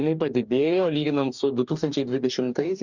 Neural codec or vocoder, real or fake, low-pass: codec, 44.1 kHz, 2.6 kbps, DAC; fake; 7.2 kHz